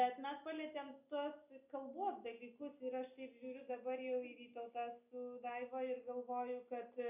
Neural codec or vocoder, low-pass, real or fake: none; 3.6 kHz; real